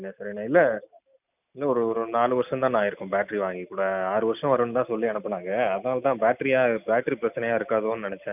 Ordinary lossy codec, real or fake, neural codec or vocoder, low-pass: none; real; none; 3.6 kHz